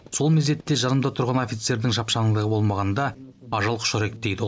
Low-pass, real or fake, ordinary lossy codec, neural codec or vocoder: none; real; none; none